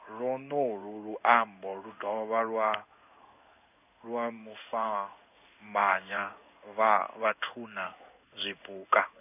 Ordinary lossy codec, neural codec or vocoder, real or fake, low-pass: none; codec, 16 kHz in and 24 kHz out, 1 kbps, XY-Tokenizer; fake; 3.6 kHz